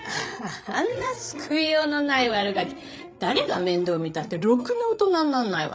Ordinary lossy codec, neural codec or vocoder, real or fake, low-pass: none; codec, 16 kHz, 8 kbps, FreqCodec, larger model; fake; none